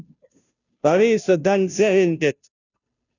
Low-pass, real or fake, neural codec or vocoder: 7.2 kHz; fake; codec, 16 kHz, 0.5 kbps, FunCodec, trained on Chinese and English, 25 frames a second